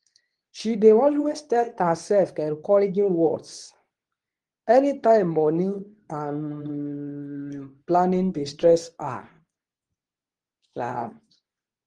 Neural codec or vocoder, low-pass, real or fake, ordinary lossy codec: codec, 24 kHz, 0.9 kbps, WavTokenizer, medium speech release version 2; 10.8 kHz; fake; Opus, 24 kbps